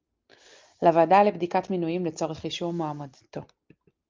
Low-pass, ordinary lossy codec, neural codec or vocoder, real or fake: 7.2 kHz; Opus, 24 kbps; none; real